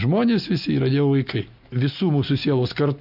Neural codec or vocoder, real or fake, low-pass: none; real; 5.4 kHz